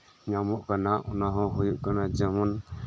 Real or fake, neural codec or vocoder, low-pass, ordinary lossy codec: real; none; none; none